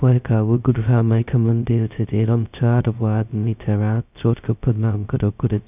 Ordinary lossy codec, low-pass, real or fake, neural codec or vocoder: none; 3.6 kHz; fake; codec, 16 kHz, 0.2 kbps, FocalCodec